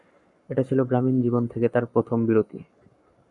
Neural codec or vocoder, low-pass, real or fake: codec, 44.1 kHz, 7.8 kbps, Pupu-Codec; 10.8 kHz; fake